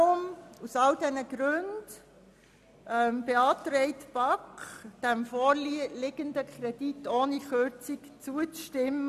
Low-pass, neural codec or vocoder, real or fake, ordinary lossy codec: 14.4 kHz; none; real; none